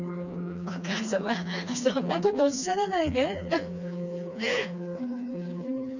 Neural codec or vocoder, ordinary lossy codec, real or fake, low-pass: codec, 16 kHz, 2 kbps, FreqCodec, smaller model; none; fake; 7.2 kHz